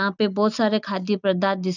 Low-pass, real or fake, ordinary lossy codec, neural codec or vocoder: 7.2 kHz; real; none; none